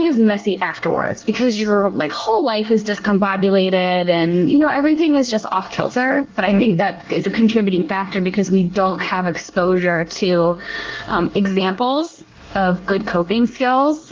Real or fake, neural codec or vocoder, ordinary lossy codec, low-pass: fake; codec, 24 kHz, 1 kbps, SNAC; Opus, 32 kbps; 7.2 kHz